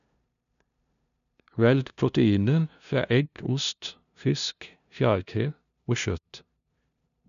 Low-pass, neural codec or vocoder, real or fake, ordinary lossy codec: 7.2 kHz; codec, 16 kHz, 0.5 kbps, FunCodec, trained on LibriTTS, 25 frames a second; fake; none